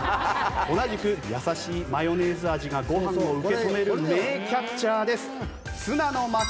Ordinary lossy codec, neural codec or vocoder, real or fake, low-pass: none; none; real; none